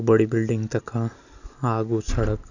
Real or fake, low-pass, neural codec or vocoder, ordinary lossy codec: real; 7.2 kHz; none; none